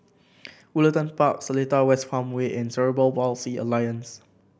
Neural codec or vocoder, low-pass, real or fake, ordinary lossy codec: none; none; real; none